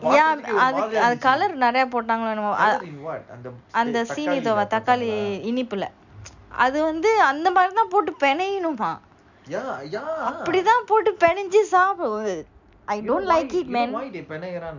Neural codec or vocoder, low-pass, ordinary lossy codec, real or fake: none; 7.2 kHz; none; real